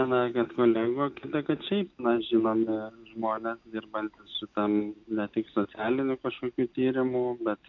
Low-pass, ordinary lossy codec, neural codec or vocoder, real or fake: 7.2 kHz; MP3, 48 kbps; none; real